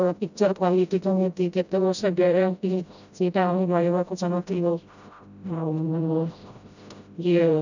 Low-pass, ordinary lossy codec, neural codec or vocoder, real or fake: 7.2 kHz; none; codec, 16 kHz, 0.5 kbps, FreqCodec, smaller model; fake